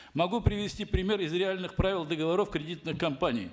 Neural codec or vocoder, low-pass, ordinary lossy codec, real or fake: none; none; none; real